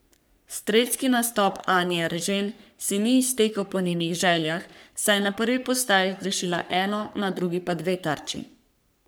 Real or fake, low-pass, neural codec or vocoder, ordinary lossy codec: fake; none; codec, 44.1 kHz, 3.4 kbps, Pupu-Codec; none